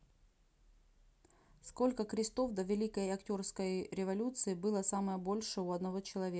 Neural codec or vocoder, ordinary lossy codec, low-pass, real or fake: none; none; none; real